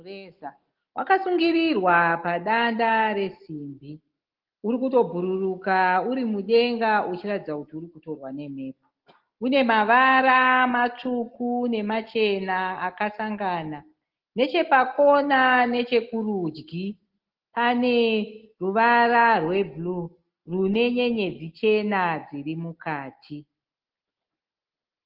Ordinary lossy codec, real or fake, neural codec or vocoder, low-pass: Opus, 16 kbps; real; none; 5.4 kHz